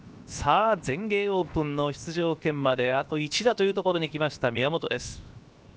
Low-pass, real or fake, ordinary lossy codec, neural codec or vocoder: none; fake; none; codec, 16 kHz, 0.7 kbps, FocalCodec